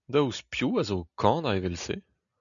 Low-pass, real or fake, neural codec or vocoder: 7.2 kHz; real; none